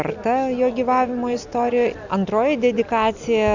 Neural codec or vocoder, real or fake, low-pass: none; real; 7.2 kHz